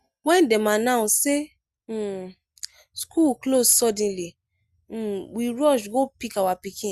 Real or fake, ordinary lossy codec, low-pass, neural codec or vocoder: real; Opus, 64 kbps; 14.4 kHz; none